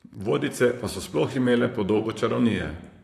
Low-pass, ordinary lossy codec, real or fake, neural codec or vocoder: 14.4 kHz; AAC, 64 kbps; fake; vocoder, 44.1 kHz, 128 mel bands, Pupu-Vocoder